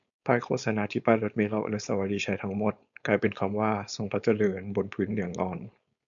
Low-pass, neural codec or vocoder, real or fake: 7.2 kHz; codec, 16 kHz, 4.8 kbps, FACodec; fake